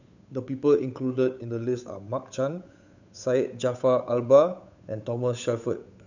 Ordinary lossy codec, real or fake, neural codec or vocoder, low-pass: none; fake; codec, 16 kHz, 8 kbps, FunCodec, trained on Chinese and English, 25 frames a second; 7.2 kHz